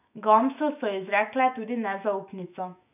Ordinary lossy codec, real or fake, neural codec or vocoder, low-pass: none; fake; autoencoder, 48 kHz, 128 numbers a frame, DAC-VAE, trained on Japanese speech; 3.6 kHz